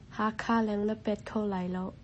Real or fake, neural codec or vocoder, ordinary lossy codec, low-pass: real; none; MP3, 32 kbps; 10.8 kHz